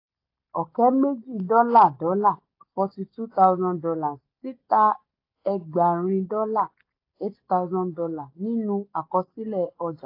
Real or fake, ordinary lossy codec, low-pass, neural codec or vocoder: real; AAC, 32 kbps; 5.4 kHz; none